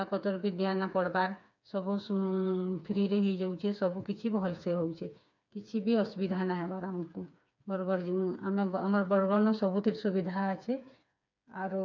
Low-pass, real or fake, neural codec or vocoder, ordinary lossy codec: 7.2 kHz; fake; codec, 16 kHz, 4 kbps, FreqCodec, smaller model; none